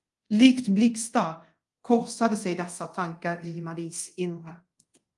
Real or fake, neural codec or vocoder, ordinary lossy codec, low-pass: fake; codec, 24 kHz, 0.5 kbps, DualCodec; Opus, 32 kbps; 10.8 kHz